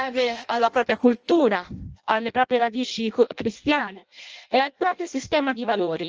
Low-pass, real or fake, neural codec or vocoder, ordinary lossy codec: 7.2 kHz; fake; codec, 16 kHz in and 24 kHz out, 0.6 kbps, FireRedTTS-2 codec; Opus, 24 kbps